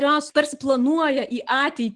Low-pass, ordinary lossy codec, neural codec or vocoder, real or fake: 10.8 kHz; Opus, 24 kbps; none; real